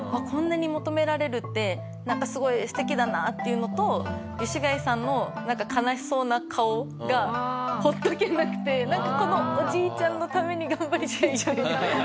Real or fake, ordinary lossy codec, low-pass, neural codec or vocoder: real; none; none; none